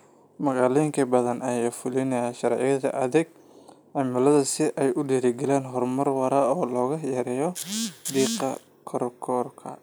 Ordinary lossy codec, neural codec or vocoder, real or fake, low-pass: none; none; real; none